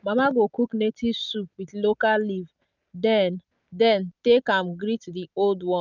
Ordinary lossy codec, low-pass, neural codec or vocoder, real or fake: none; 7.2 kHz; none; real